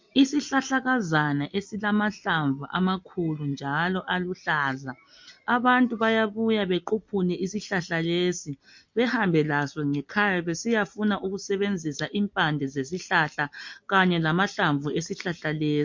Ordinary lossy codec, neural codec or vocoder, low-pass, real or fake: MP3, 48 kbps; none; 7.2 kHz; real